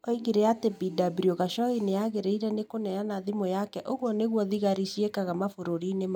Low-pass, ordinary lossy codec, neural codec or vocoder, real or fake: 19.8 kHz; none; none; real